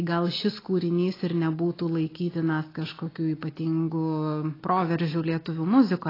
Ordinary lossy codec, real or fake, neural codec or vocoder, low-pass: AAC, 24 kbps; real; none; 5.4 kHz